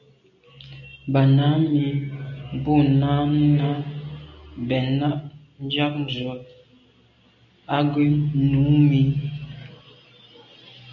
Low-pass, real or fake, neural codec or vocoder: 7.2 kHz; real; none